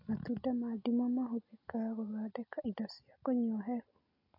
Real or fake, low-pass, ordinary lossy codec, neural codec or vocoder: real; 5.4 kHz; AAC, 32 kbps; none